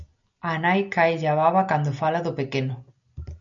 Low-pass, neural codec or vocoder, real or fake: 7.2 kHz; none; real